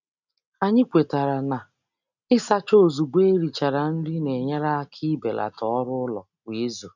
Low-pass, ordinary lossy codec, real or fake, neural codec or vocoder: 7.2 kHz; none; real; none